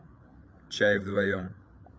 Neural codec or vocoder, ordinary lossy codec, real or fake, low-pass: codec, 16 kHz, 8 kbps, FreqCodec, larger model; none; fake; none